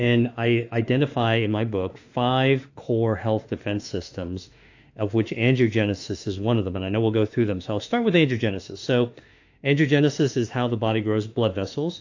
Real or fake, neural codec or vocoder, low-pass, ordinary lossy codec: fake; autoencoder, 48 kHz, 32 numbers a frame, DAC-VAE, trained on Japanese speech; 7.2 kHz; AAC, 48 kbps